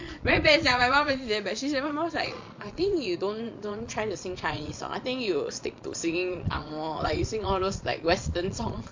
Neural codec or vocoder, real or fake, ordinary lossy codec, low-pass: vocoder, 22.05 kHz, 80 mel bands, Vocos; fake; MP3, 48 kbps; 7.2 kHz